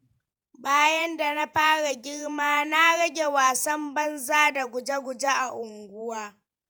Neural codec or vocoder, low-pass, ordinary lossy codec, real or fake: vocoder, 48 kHz, 128 mel bands, Vocos; none; none; fake